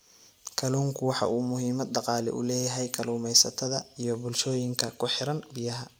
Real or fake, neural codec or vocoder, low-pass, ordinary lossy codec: real; none; none; none